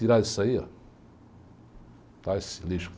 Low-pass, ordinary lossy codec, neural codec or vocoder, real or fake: none; none; none; real